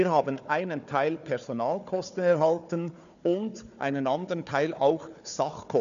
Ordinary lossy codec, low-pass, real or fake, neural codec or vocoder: none; 7.2 kHz; fake; codec, 16 kHz, 4 kbps, FunCodec, trained on Chinese and English, 50 frames a second